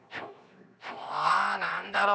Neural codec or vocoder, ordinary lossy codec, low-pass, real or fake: codec, 16 kHz, 0.7 kbps, FocalCodec; none; none; fake